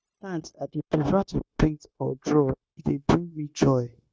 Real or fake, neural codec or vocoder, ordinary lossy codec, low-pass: fake; codec, 16 kHz, 0.9 kbps, LongCat-Audio-Codec; none; none